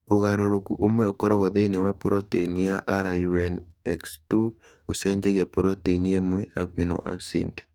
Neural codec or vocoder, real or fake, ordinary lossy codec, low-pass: codec, 44.1 kHz, 2.6 kbps, DAC; fake; none; 19.8 kHz